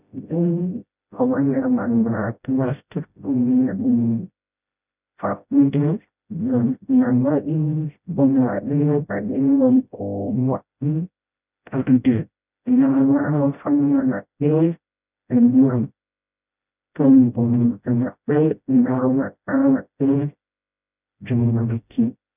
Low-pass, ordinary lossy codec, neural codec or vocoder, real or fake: 3.6 kHz; none; codec, 16 kHz, 0.5 kbps, FreqCodec, smaller model; fake